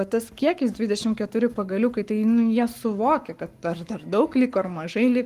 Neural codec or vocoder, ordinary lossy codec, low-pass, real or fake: codec, 44.1 kHz, 7.8 kbps, Pupu-Codec; Opus, 24 kbps; 14.4 kHz; fake